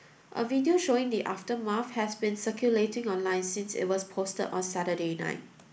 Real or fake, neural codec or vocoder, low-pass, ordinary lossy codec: real; none; none; none